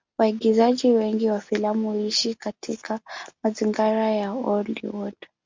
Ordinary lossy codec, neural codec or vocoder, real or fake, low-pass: MP3, 64 kbps; none; real; 7.2 kHz